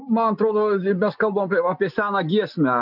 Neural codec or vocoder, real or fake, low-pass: none; real; 5.4 kHz